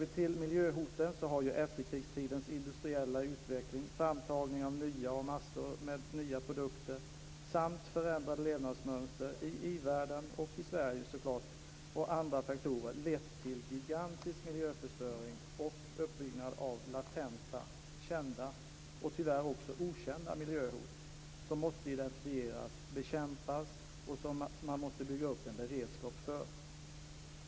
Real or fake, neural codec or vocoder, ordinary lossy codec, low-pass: real; none; none; none